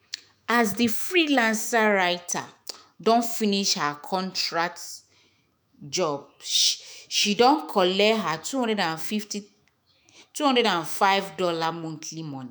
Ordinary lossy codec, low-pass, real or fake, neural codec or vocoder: none; none; fake; autoencoder, 48 kHz, 128 numbers a frame, DAC-VAE, trained on Japanese speech